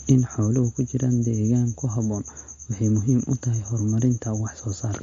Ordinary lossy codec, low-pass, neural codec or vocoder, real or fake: MP3, 48 kbps; 7.2 kHz; none; real